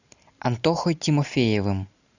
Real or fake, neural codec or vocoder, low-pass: real; none; 7.2 kHz